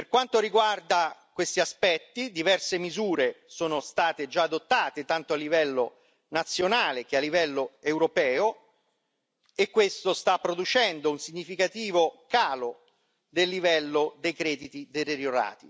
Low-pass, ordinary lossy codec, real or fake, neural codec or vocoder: none; none; real; none